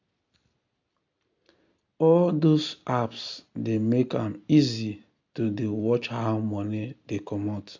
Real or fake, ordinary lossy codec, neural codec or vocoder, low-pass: real; MP3, 64 kbps; none; 7.2 kHz